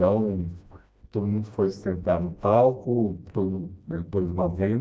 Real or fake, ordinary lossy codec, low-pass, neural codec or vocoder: fake; none; none; codec, 16 kHz, 1 kbps, FreqCodec, smaller model